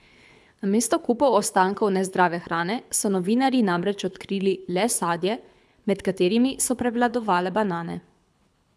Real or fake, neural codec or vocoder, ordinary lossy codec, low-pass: fake; codec, 24 kHz, 6 kbps, HILCodec; none; none